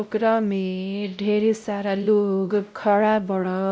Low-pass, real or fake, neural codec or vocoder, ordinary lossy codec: none; fake; codec, 16 kHz, 0.5 kbps, X-Codec, WavLM features, trained on Multilingual LibriSpeech; none